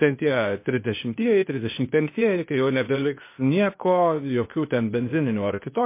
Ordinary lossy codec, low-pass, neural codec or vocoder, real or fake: MP3, 24 kbps; 3.6 kHz; codec, 16 kHz, 0.8 kbps, ZipCodec; fake